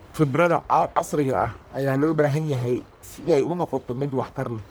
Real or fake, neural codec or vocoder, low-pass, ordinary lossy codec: fake; codec, 44.1 kHz, 1.7 kbps, Pupu-Codec; none; none